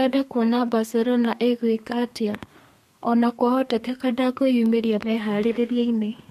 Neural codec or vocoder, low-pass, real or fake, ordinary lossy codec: codec, 32 kHz, 1.9 kbps, SNAC; 14.4 kHz; fake; MP3, 64 kbps